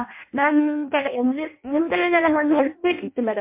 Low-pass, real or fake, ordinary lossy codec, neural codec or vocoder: 3.6 kHz; fake; MP3, 32 kbps; codec, 16 kHz in and 24 kHz out, 0.6 kbps, FireRedTTS-2 codec